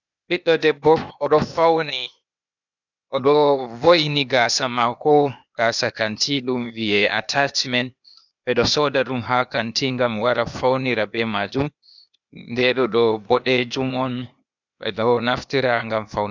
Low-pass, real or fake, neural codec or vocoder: 7.2 kHz; fake; codec, 16 kHz, 0.8 kbps, ZipCodec